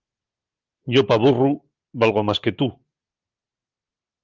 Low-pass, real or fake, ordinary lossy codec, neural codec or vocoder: 7.2 kHz; real; Opus, 32 kbps; none